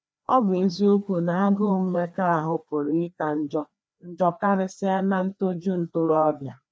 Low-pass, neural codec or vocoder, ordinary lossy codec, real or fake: none; codec, 16 kHz, 2 kbps, FreqCodec, larger model; none; fake